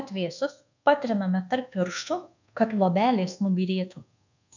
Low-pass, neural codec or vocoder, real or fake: 7.2 kHz; codec, 24 kHz, 1.2 kbps, DualCodec; fake